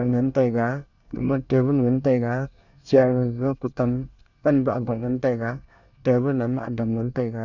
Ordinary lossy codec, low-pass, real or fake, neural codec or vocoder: none; 7.2 kHz; fake; codec, 24 kHz, 1 kbps, SNAC